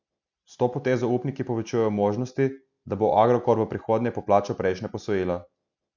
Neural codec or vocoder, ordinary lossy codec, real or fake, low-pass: none; none; real; 7.2 kHz